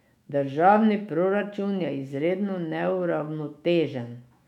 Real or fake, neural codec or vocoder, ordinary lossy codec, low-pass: fake; autoencoder, 48 kHz, 128 numbers a frame, DAC-VAE, trained on Japanese speech; none; 19.8 kHz